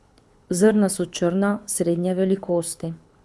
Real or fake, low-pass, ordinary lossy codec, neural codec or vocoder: fake; none; none; codec, 24 kHz, 6 kbps, HILCodec